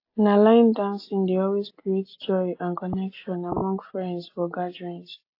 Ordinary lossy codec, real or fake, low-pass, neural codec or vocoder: AAC, 32 kbps; real; 5.4 kHz; none